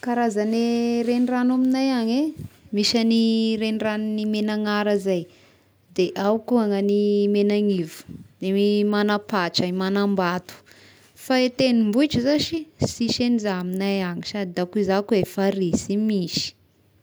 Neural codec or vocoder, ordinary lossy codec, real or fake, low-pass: none; none; real; none